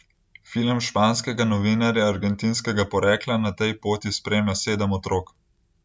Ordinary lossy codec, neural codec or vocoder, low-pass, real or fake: none; none; none; real